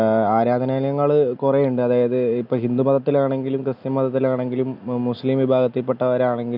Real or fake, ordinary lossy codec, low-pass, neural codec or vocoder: real; none; 5.4 kHz; none